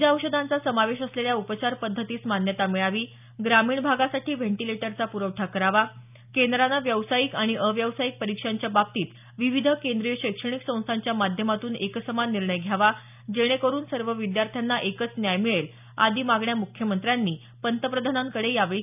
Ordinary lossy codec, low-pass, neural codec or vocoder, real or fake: none; 3.6 kHz; none; real